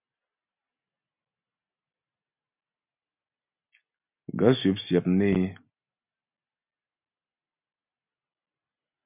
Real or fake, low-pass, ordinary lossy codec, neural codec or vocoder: real; 3.6 kHz; MP3, 32 kbps; none